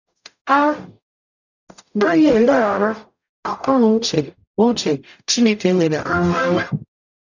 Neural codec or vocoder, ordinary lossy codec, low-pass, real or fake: codec, 44.1 kHz, 0.9 kbps, DAC; none; 7.2 kHz; fake